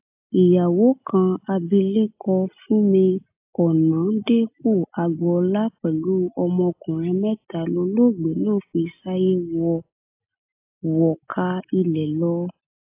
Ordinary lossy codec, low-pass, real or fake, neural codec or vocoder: AAC, 32 kbps; 3.6 kHz; real; none